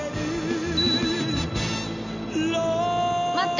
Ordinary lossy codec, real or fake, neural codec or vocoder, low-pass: none; real; none; 7.2 kHz